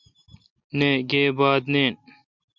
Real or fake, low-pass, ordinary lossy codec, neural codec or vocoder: real; 7.2 kHz; MP3, 64 kbps; none